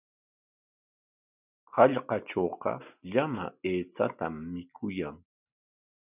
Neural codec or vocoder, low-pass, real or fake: none; 3.6 kHz; real